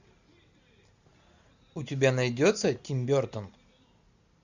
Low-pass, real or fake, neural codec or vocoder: 7.2 kHz; real; none